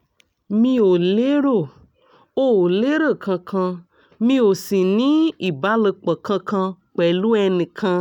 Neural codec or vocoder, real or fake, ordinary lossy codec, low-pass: none; real; none; 19.8 kHz